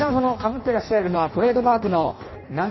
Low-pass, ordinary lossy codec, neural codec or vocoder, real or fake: 7.2 kHz; MP3, 24 kbps; codec, 16 kHz in and 24 kHz out, 0.6 kbps, FireRedTTS-2 codec; fake